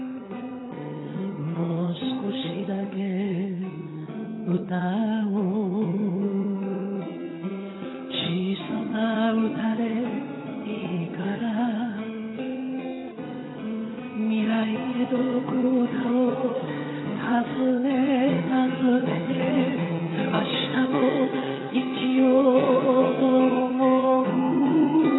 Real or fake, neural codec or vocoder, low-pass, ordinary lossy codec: fake; vocoder, 22.05 kHz, 80 mel bands, HiFi-GAN; 7.2 kHz; AAC, 16 kbps